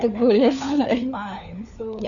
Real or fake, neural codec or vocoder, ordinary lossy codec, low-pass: fake; codec, 16 kHz, 4 kbps, FunCodec, trained on LibriTTS, 50 frames a second; none; 7.2 kHz